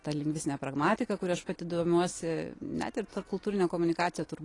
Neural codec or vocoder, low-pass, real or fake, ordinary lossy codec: none; 10.8 kHz; real; AAC, 32 kbps